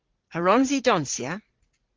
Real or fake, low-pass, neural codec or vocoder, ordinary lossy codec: fake; 7.2 kHz; vocoder, 44.1 kHz, 80 mel bands, Vocos; Opus, 16 kbps